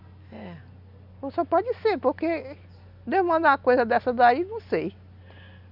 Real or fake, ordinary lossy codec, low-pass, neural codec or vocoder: real; none; 5.4 kHz; none